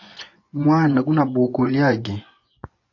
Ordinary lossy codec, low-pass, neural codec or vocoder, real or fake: AAC, 48 kbps; 7.2 kHz; vocoder, 24 kHz, 100 mel bands, Vocos; fake